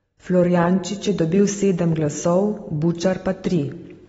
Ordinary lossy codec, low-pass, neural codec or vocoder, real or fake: AAC, 24 kbps; 10.8 kHz; none; real